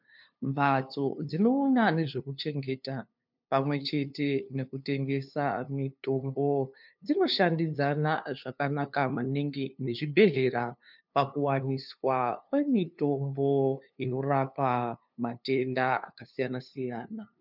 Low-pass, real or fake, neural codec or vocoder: 5.4 kHz; fake; codec, 16 kHz, 2 kbps, FunCodec, trained on LibriTTS, 25 frames a second